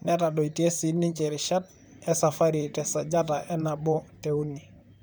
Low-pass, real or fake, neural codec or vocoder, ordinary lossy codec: none; fake; vocoder, 44.1 kHz, 128 mel bands, Pupu-Vocoder; none